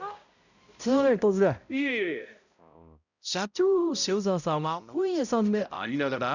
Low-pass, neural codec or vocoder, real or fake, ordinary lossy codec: 7.2 kHz; codec, 16 kHz, 0.5 kbps, X-Codec, HuBERT features, trained on balanced general audio; fake; none